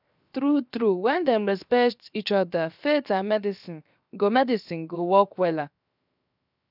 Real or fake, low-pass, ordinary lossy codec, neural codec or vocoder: fake; 5.4 kHz; none; codec, 16 kHz, 0.7 kbps, FocalCodec